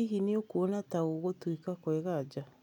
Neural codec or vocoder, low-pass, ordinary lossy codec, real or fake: none; 19.8 kHz; none; real